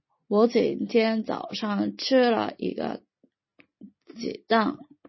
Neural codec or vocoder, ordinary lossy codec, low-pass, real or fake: none; MP3, 24 kbps; 7.2 kHz; real